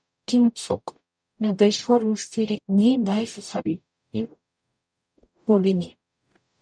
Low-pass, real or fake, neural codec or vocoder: 9.9 kHz; fake; codec, 44.1 kHz, 0.9 kbps, DAC